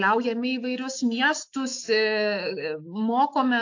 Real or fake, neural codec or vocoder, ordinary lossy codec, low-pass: fake; autoencoder, 48 kHz, 128 numbers a frame, DAC-VAE, trained on Japanese speech; AAC, 48 kbps; 7.2 kHz